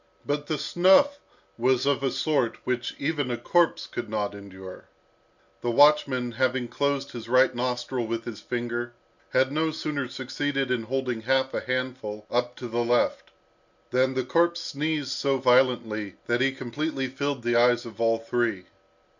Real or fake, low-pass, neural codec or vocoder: real; 7.2 kHz; none